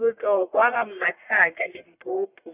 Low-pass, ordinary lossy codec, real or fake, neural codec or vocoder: 3.6 kHz; none; fake; codec, 44.1 kHz, 1.7 kbps, Pupu-Codec